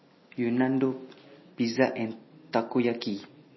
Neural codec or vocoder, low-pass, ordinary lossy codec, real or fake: none; 7.2 kHz; MP3, 24 kbps; real